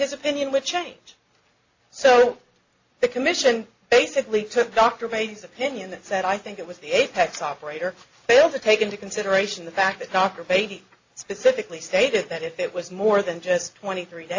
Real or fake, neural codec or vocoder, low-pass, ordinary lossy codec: real; none; 7.2 kHz; AAC, 48 kbps